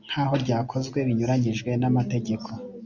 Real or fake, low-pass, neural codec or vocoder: real; 7.2 kHz; none